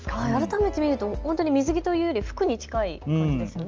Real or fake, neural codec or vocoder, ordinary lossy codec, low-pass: real; none; Opus, 24 kbps; 7.2 kHz